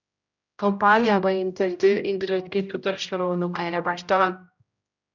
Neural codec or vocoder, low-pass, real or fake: codec, 16 kHz, 0.5 kbps, X-Codec, HuBERT features, trained on general audio; 7.2 kHz; fake